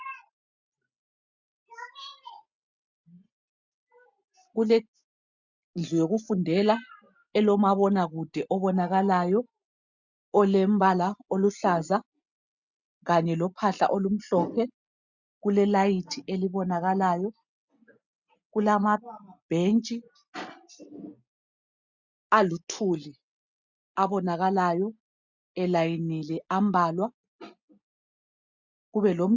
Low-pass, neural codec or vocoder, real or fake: 7.2 kHz; none; real